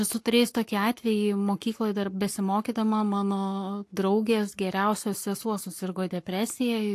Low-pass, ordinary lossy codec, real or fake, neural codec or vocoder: 14.4 kHz; AAC, 64 kbps; fake; codec, 44.1 kHz, 7.8 kbps, Pupu-Codec